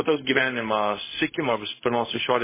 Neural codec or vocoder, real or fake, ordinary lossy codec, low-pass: codec, 16 kHz, 0.4 kbps, LongCat-Audio-Codec; fake; MP3, 16 kbps; 3.6 kHz